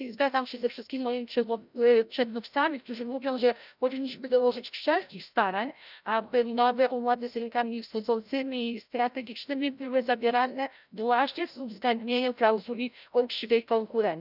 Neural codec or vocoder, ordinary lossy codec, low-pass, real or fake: codec, 16 kHz, 0.5 kbps, FreqCodec, larger model; none; 5.4 kHz; fake